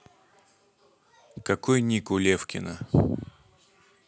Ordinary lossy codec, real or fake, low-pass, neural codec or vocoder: none; real; none; none